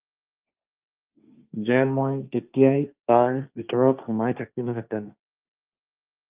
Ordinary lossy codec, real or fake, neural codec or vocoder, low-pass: Opus, 24 kbps; fake; codec, 16 kHz, 1.1 kbps, Voila-Tokenizer; 3.6 kHz